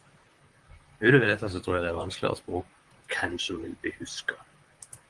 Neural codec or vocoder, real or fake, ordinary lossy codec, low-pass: vocoder, 44.1 kHz, 128 mel bands, Pupu-Vocoder; fake; Opus, 24 kbps; 10.8 kHz